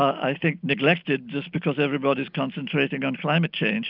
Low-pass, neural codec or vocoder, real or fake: 5.4 kHz; none; real